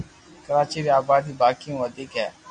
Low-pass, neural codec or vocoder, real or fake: 9.9 kHz; none; real